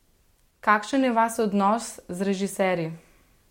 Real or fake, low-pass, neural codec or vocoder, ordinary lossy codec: real; 19.8 kHz; none; MP3, 64 kbps